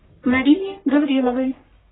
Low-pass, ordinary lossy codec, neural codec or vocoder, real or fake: 7.2 kHz; AAC, 16 kbps; codec, 44.1 kHz, 1.7 kbps, Pupu-Codec; fake